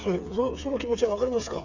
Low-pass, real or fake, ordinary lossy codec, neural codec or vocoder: 7.2 kHz; fake; none; codec, 16 kHz, 8 kbps, FreqCodec, smaller model